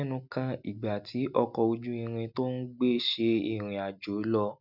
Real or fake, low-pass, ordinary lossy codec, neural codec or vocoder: real; 5.4 kHz; none; none